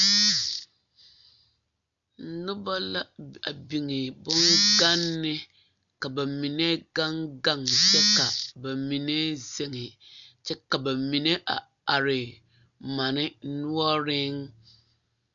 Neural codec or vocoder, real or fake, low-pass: none; real; 7.2 kHz